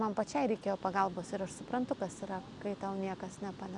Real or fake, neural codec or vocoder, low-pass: real; none; 10.8 kHz